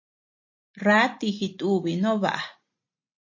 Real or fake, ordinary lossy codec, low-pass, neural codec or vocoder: real; MP3, 32 kbps; 7.2 kHz; none